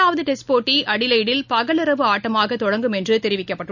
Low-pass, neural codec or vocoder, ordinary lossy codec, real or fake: 7.2 kHz; none; none; real